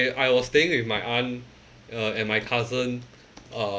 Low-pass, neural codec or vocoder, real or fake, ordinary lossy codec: none; none; real; none